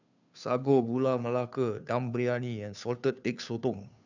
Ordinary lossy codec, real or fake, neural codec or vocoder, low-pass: none; fake; codec, 16 kHz, 2 kbps, FunCodec, trained on Chinese and English, 25 frames a second; 7.2 kHz